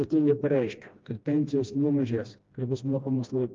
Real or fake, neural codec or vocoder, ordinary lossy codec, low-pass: fake; codec, 16 kHz, 1 kbps, FreqCodec, smaller model; Opus, 32 kbps; 7.2 kHz